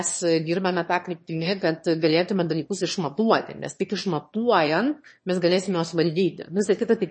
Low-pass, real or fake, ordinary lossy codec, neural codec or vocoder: 9.9 kHz; fake; MP3, 32 kbps; autoencoder, 22.05 kHz, a latent of 192 numbers a frame, VITS, trained on one speaker